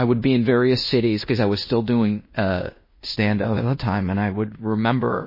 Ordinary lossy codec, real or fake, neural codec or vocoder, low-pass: MP3, 24 kbps; fake; codec, 16 kHz in and 24 kHz out, 0.9 kbps, LongCat-Audio-Codec, fine tuned four codebook decoder; 5.4 kHz